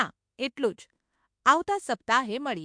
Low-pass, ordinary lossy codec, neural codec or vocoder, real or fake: 9.9 kHz; MP3, 64 kbps; autoencoder, 48 kHz, 32 numbers a frame, DAC-VAE, trained on Japanese speech; fake